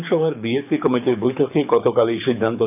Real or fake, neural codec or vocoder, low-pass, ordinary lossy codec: fake; codec, 24 kHz, 6 kbps, HILCodec; 3.6 kHz; none